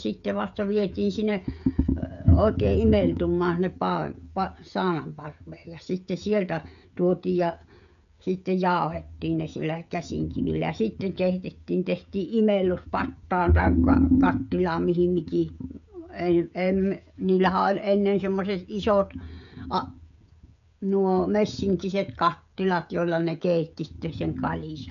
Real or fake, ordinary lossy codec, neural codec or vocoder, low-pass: fake; none; codec, 16 kHz, 16 kbps, FreqCodec, smaller model; 7.2 kHz